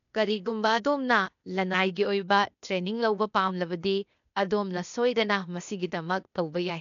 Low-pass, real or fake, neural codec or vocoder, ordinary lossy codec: 7.2 kHz; fake; codec, 16 kHz, 0.8 kbps, ZipCodec; none